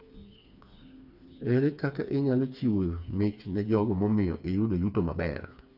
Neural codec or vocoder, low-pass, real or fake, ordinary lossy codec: codec, 16 kHz, 4 kbps, FreqCodec, smaller model; 5.4 kHz; fake; none